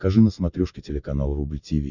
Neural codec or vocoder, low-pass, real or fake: none; 7.2 kHz; real